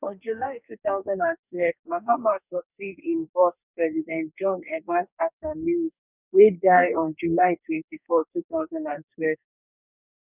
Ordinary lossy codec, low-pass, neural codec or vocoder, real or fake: none; 3.6 kHz; codec, 44.1 kHz, 2.6 kbps, DAC; fake